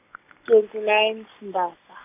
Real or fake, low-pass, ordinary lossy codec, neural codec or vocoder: real; 3.6 kHz; none; none